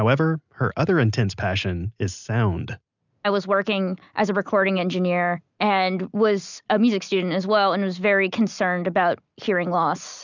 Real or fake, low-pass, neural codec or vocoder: real; 7.2 kHz; none